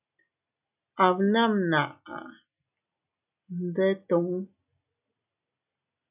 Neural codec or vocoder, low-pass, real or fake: none; 3.6 kHz; real